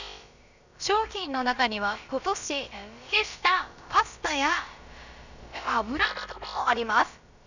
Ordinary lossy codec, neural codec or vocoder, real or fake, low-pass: none; codec, 16 kHz, about 1 kbps, DyCAST, with the encoder's durations; fake; 7.2 kHz